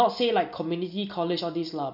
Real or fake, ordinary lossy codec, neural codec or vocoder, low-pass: real; none; none; 5.4 kHz